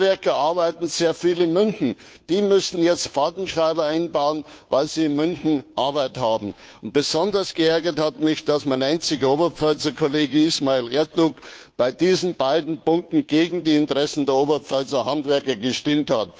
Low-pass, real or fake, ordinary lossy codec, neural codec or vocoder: none; fake; none; codec, 16 kHz, 2 kbps, FunCodec, trained on Chinese and English, 25 frames a second